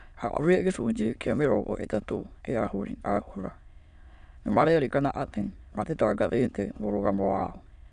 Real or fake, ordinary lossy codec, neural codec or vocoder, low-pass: fake; none; autoencoder, 22.05 kHz, a latent of 192 numbers a frame, VITS, trained on many speakers; 9.9 kHz